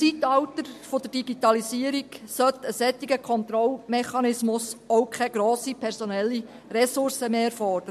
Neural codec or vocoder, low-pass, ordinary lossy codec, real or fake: none; 14.4 kHz; MP3, 64 kbps; real